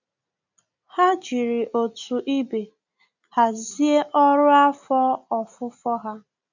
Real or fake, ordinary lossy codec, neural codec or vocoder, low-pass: real; none; none; 7.2 kHz